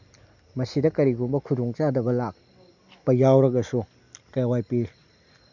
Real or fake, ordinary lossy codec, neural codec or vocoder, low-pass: real; none; none; 7.2 kHz